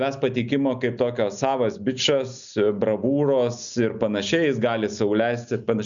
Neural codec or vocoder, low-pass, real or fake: none; 7.2 kHz; real